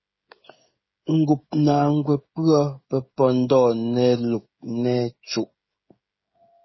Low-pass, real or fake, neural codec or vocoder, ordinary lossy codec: 7.2 kHz; fake; codec, 16 kHz, 16 kbps, FreqCodec, smaller model; MP3, 24 kbps